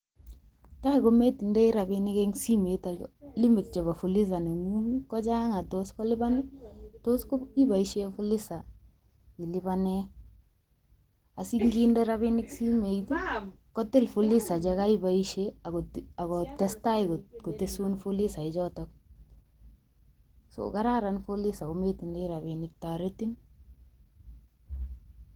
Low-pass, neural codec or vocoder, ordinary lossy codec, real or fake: 19.8 kHz; none; Opus, 16 kbps; real